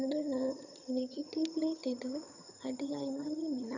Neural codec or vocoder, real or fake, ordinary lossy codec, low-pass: vocoder, 22.05 kHz, 80 mel bands, HiFi-GAN; fake; none; 7.2 kHz